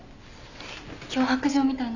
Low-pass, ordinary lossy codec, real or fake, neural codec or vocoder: 7.2 kHz; none; real; none